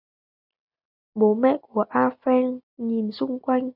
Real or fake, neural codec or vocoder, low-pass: real; none; 5.4 kHz